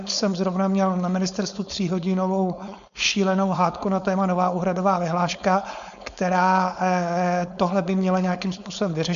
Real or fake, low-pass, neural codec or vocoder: fake; 7.2 kHz; codec, 16 kHz, 4.8 kbps, FACodec